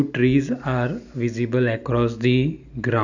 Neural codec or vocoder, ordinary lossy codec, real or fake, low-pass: none; none; real; 7.2 kHz